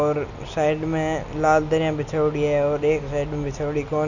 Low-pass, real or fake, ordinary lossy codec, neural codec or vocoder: 7.2 kHz; real; none; none